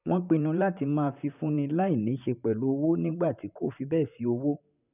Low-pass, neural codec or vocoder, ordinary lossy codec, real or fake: 3.6 kHz; vocoder, 44.1 kHz, 128 mel bands, Pupu-Vocoder; none; fake